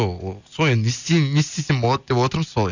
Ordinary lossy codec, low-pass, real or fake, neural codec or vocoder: none; 7.2 kHz; real; none